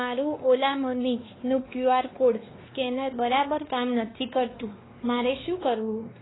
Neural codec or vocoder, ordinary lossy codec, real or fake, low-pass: codec, 16 kHz, 2 kbps, X-Codec, WavLM features, trained on Multilingual LibriSpeech; AAC, 16 kbps; fake; 7.2 kHz